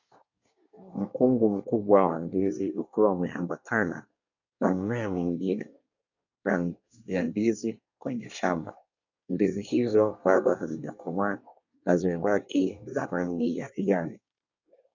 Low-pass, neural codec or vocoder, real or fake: 7.2 kHz; codec, 24 kHz, 1 kbps, SNAC; fake